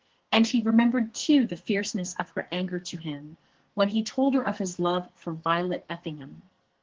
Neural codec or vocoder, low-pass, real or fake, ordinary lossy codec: codec, 44.1 kHz, 2.6 kbps, SNAC; 7.2 kHz; fake; Opus, 16 kbps